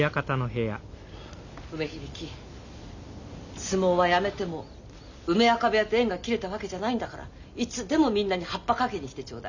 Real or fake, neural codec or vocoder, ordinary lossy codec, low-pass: real; none; none; 7.2 kHz